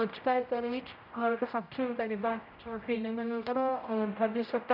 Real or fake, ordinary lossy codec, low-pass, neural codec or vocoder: fake; none; 5.4 kHz; codec, 16 kHz, 0.5 kbps, X-Codec, HuBERT features, trained on general audio